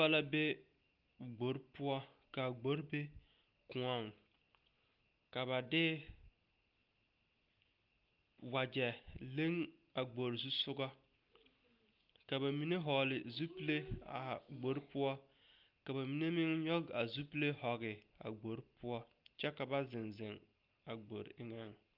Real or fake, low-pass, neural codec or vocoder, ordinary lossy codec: real; 5.4 kHz; none; Opus, 32 kbps